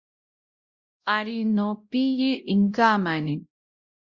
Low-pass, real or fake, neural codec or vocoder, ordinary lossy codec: 7.2 kHz; fake; codec, 16 kHz, 0.5 kbps, X-Codec, WavLM features, trained on Multilingual LibriSpeech; Opus, 64 kbps